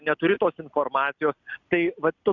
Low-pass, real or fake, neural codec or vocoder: 7.2 kHz; real; none